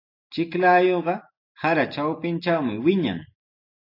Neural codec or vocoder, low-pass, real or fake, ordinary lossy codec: none; 5.4 kHz; real; AAC, 32 kbps